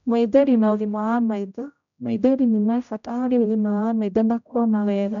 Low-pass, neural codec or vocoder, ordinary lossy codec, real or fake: 7.2 kHz; codec, 16 kHz, 0.5 kbps, X-Codec, HuBERT features, trained on general audio; none; fake